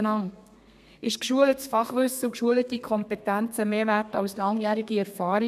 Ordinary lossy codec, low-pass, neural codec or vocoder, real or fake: none; 14.4 kHz; codec, 32 kHz, 1.9 kbps, SNAC; fake